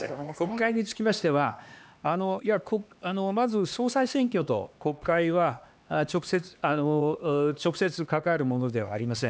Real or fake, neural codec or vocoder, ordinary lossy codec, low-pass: fake; codec, 16 kHz, 2 kbps, X-Codec, HuBERT features, trained on LibriSpeech; none; none